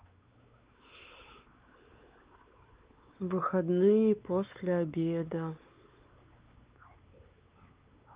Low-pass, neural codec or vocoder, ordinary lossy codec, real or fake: 3.6 kHz; codec, 16 kHz, 4 kbps, X-Codec, WavLM features, trained on Multilingual LibriSpeech; Opus, 32 kbps; fake